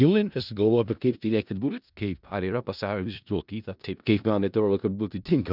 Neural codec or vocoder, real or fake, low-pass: codec, 16 kHz in and 24 kHz out, 0.4 kbps, LongCat-Audio-Codec, four codebook decoder; fake; 5.4 kHz